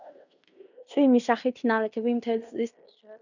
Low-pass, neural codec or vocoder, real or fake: 7.2 kHz; codec, 16 kHz in and 24 kHz out, 0.9 kbps, LongCat-Audio-Codec, fine tuned four codebook decoder; fake